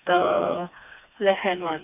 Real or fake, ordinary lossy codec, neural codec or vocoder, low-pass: fake; none; codec, 16 kHz, 2 kbps, FreqCodec, smaller model; 3.6 kHz